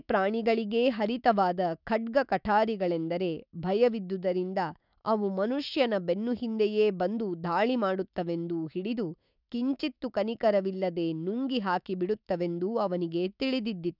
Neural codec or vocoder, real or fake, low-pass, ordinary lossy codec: autoencoder, 48 kHz, 128 numbers a frame, DAC-VAE, trained on Japanese speech; fake; 5.4 kHz; none